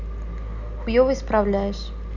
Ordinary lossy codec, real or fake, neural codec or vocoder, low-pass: none; real; none; 7.2 kHz